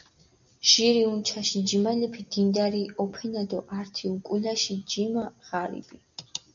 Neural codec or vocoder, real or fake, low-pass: none; real; 7.2 kHz